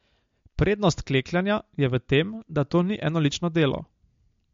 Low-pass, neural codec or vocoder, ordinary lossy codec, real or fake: 7.2 kHz; none; MP3, 48 kbps; real